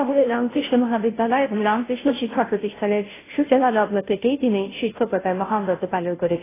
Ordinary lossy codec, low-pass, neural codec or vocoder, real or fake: AAC, 16 kbps; 3.6 kHz; codec, 16 kHz, 0.5 kbps, FunCodec, trained on Chinese and English, 25 frames a second; fake